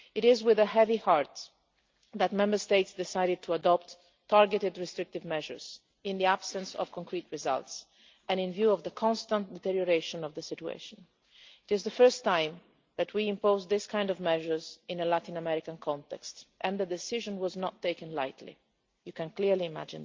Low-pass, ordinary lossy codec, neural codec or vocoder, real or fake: 7.2 kHz; Opus, 24 kbps; none; real